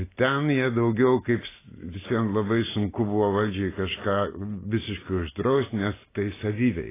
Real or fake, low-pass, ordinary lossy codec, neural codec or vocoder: real; 3.6 kHz; AAC, 16 kbps; none